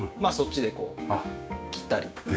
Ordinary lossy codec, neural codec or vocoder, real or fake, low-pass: none; codec, 16 kHz, 6 kbps, DAC; fake; none